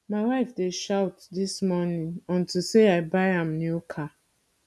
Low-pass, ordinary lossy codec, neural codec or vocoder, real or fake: none; none; none; real